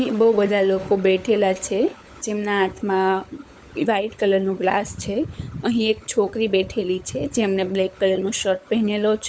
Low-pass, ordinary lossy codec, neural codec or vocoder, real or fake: none; none; codec, 16 kHz, 16 kbps, FunCodec, trained on LibriTTS, 50 frames a second; fake